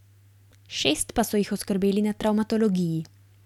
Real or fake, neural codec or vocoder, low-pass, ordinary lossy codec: real; none; 19.8 kHz; none